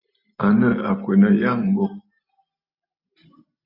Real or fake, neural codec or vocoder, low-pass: real; none; 5.4 kHz